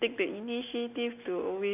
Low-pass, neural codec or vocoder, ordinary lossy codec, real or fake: 3.6 kHz; none; none; real